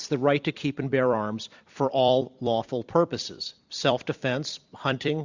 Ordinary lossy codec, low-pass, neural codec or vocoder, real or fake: Opus, 64 kbps; 7.2 kHz; vocoder, 44.1 kHz, 128 mel bands every 256 samples, BigVGAN v2; fake